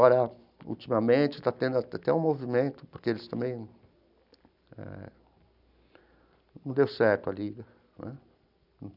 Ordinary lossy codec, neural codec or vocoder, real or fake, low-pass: none; none; real; 5.4 kHz